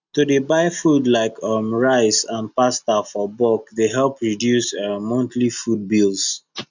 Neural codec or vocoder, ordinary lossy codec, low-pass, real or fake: none; none; 7.2 kHz; real